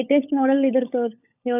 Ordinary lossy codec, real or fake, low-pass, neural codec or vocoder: none; fake; 3.6 kHz; codec, 16 kHz, 16 kbps, FunCodec, trained on LibriTTS, 50 frames a second